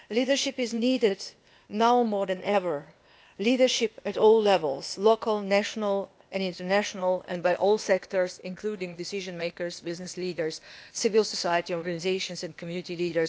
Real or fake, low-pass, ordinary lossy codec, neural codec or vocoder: fake; none; none; codec, 16 kHz, 0.8 kbps, ZipCodec